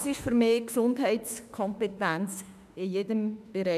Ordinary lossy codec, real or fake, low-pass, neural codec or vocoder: none; fake; 14.4 kHz; autoencoder, 48 kHz, 32 numbers a frame, DAC-VAE, trained on Japanese speech